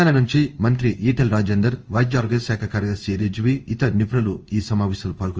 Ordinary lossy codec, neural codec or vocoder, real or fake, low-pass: Opus, 24 kbps; codec, 16 kHz in and 24 kHz out, 1 kbps, XY-Tokenizer; fake; 7.2 kHz